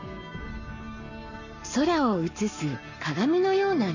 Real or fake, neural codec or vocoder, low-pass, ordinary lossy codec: fake; codec, 44.1 kHz, 7.8 kbps, Pupu-Codec; 7.2 kHz; none